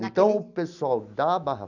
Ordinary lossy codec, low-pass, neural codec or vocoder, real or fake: none; 7.2 kHz; none; real